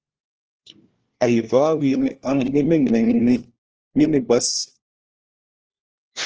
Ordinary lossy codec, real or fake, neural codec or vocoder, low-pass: Opus, 16 kbps; fake; codec, 16 kHz, 1 kbps, FunCodec, trained on LibriTTS, 50 frames a second; 7.2 kHz